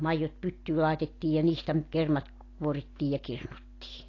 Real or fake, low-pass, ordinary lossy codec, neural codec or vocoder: real; 7.2 kHz; AAC, 32 kbps; none